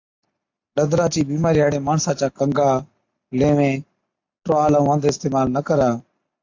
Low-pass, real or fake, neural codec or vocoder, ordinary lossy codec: 7.2 kHz; real; none; AAC, 48 kbps